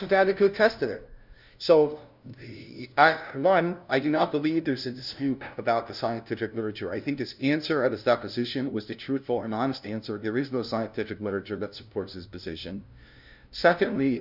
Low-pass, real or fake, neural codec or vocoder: 5.4 kHz; fake; codec, 16 kHz, 0.5 kbps, FunCodec, trained on LibriTTS, 25 frames a second